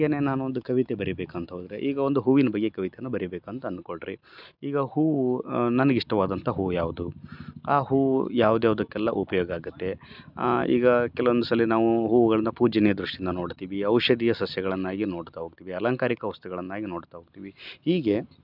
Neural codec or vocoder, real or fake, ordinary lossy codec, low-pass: none; real; none; 5.4 kHz